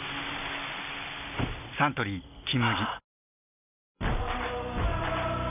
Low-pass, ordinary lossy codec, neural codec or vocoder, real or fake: 3.6 kHz; none; none; real